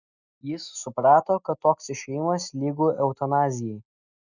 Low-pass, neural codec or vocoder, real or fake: 7.2 kHz; none; real